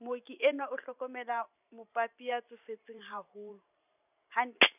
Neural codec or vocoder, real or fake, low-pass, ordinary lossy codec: vocoder, 44.1 kHz, 128 mel bands every 512 samples, BigVGAN v2; fake; 3.6 kHz; none